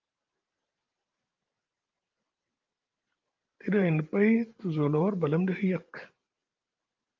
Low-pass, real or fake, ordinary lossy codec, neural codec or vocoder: 7.2 kHz; fake; Opus, 32 kbps; vocoder, 44.1 kHz, 128 mel bands every 512 samples, BigVGAN v2